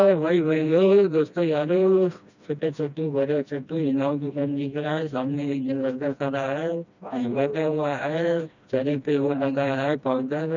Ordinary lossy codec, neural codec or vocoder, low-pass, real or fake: none; codec, 16 kHz, 1 kbps, FreqCodec, smaller model; 7.2 kHz; fake